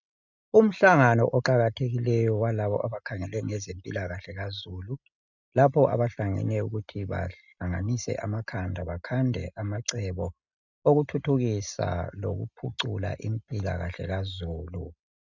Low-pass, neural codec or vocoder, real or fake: 7.2 kHz; none; real